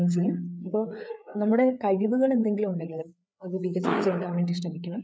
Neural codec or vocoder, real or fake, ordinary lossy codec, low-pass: codec, 16 kHz, 4 kbps, FreqCodec, larger model; fake; none; none